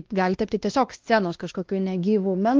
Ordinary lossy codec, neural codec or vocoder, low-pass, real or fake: Opus, 32 kbps; codec, 16 kHz, 1 kbps, X-Codec, WavLM features, trained on Multilingual LibriSpeech; 7.2 kHz; fake